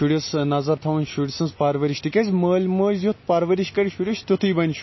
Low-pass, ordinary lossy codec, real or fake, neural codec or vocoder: 7.2 kHz; MP3, 24 kbps; real; none